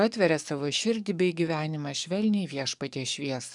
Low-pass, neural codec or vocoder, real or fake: 10.8 kHz; codec, 44.1 kHz, 7.8 kbps, DAC; fake